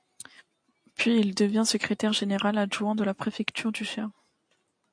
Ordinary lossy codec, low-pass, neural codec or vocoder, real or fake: AAC, 64 kbps; 9.9 kHz; none; real